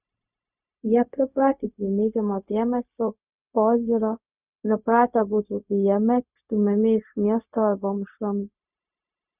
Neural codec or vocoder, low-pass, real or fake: codec, 16 kHz, 0.4 kbps, LongCat-Audio-Codec; 3.6 kHz; fake